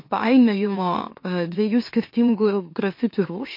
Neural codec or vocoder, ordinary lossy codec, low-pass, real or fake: autoencoder, 44.1 kHz, a latent of 192 numbers a frame, MeloTTS; MP3, 32 kbps; 5.4 kHz; fake